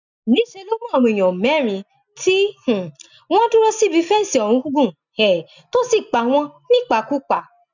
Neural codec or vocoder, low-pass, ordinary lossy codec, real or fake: none; 7.2 kHz; none; real